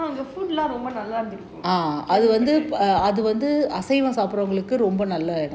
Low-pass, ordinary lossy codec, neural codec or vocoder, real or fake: none; none; none; real